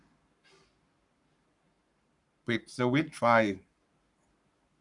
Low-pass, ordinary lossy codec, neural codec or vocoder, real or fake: 10.8 kHz; none; codec, 44.1 kHz, 7.8 kbps, Pupu-Codec; fake